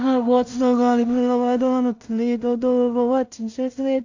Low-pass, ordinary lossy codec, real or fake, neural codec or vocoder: 7.2 kHz; AAC, 48 kbps; fake; codec, 16 kHz in and 24 kHz out, 0.4 kbps, LongCat-Audio-Codec, two codebook decoder